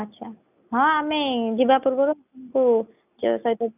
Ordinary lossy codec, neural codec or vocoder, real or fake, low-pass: none; none; real; 3.6 kHz